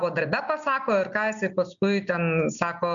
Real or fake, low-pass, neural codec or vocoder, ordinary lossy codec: real; 7.2 kHz; none; MP3, 64 kbps